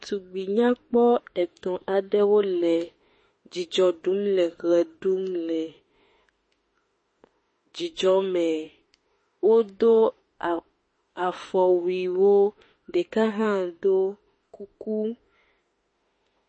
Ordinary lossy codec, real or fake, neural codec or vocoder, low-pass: MP3, 32 kbps; fake; autoencoder, 48 kHz, 32 numbers a frame, DAC-VAE, trained on Japanese speech; 9.9 kHz